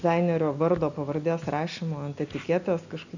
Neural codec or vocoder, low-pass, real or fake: none; 7.2 kHz; real